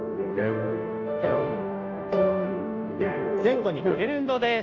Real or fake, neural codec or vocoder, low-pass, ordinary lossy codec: fake; codec, 16 kHz, 0.5 kbps, FunCodec, trained on Chinese and English, 25 frames a second; 7.2 kHz; AAC, 48 kbps